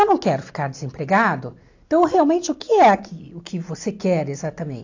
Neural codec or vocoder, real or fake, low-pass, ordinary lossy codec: none; real; 7.2 kHz; AAC, 48 kbps